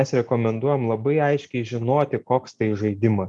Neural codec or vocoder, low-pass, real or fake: none; 10.8 kHz; real